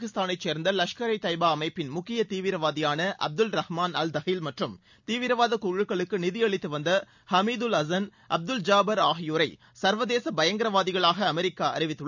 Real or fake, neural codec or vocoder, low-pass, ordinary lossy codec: real; none; 7.2 kHz; none